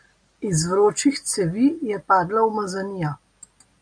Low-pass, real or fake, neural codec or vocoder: 9.9 kHz; real; none